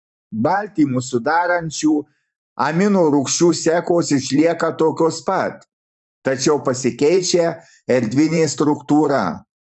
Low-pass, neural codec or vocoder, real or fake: 10.8 kHz; vocoder, 44.1 kHz, 128 mel bands every 512 samples, BigVGAN v2; fake